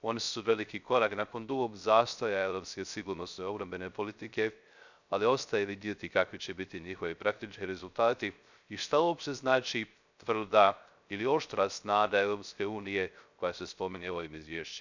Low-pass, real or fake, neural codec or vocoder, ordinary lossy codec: 7.2 kHz; fake; codec, 16 kHz, 0.3 kbps, FocalCodec; none